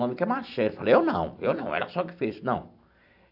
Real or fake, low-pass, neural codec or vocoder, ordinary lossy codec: fake; 5.4 kHz; vocoder, 44.1 kHz, 128 mel bands every 256 samples, BigVGAN v2; AAC, 48 kbps